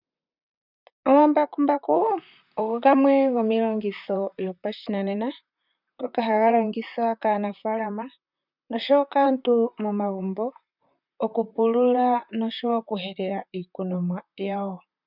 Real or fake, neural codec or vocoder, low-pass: fake; vocoder, 44.1 kHz, 128 mel bands, Pupu-Vocoder; 5.4 kHz